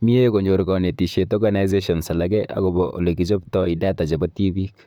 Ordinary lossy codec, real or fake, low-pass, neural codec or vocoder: none; fake; 19.8 kHz; vocoder, 44.1 kHz, 128 mel bands, Pupu-Vocoder